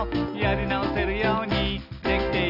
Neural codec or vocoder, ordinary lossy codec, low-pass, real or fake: none; none; 5.4 kHz; real